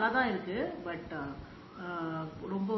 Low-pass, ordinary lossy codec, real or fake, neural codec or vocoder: 7.2 kHz; MP3, 24 kbps; real; none